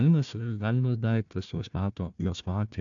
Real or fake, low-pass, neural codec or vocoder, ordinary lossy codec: fake; 7.2 kHz; codec, 16 kHz, 1 kbps, FunCodec, trained on Chinese and English, 50 frames a second; AAC, 64 kbps